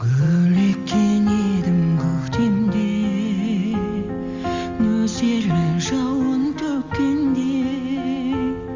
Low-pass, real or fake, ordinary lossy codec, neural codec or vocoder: 7.2 kHz; real; Opus, 32 kbps; none